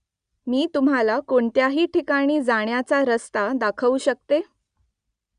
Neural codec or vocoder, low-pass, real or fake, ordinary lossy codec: none; 9.9 kHz; real; Opus, 64 kbps